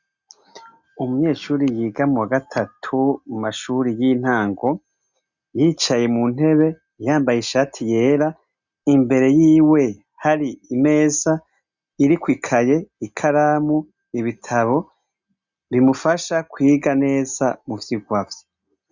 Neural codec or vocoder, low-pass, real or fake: none; 7.2 kHz; real